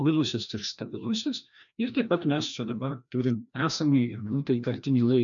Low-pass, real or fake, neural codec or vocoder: 7.2 kHz; fake; codec, 16 kHz, 1 kbps, FreqCodec, larger model